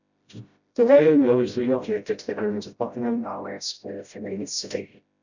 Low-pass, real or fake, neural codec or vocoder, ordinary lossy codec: 7.2 kHz; fake; codec, 16 kHz, 0.5 kbps, FreqCodec, smaller model; none